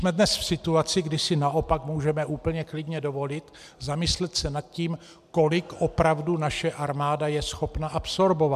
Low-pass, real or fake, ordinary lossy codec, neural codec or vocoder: 14.4 kHz; real; MP3, 96 kbps; none